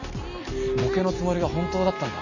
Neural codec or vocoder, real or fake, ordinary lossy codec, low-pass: none; real; none; 7.2 kHz